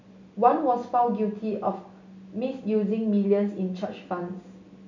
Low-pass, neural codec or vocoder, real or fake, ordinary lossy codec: 7.2 kHz; none; real; none